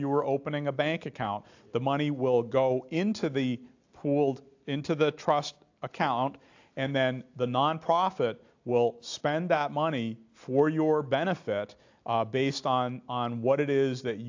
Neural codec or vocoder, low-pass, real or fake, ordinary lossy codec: none; 7.2 kHz; real; AAC, 48 kbps